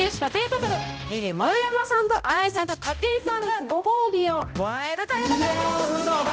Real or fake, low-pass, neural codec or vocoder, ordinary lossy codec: fake; none; codec, 16 kHz, 0.5 kbps, X-Codec, HuBERT features, trained on balanced general audio; none